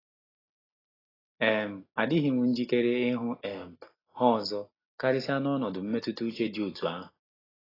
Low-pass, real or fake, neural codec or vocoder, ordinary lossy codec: 5.4 kHz; real; none; AAC, 24 kbps